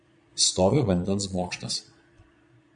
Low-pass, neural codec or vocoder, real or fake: 9.9 kHz; vocoder, 22.05 kHz, 80 mel bands, Vocos; fake